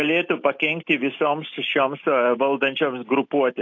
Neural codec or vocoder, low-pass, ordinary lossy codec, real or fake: none; 7.2 kHz; AAC, 48 kbps; real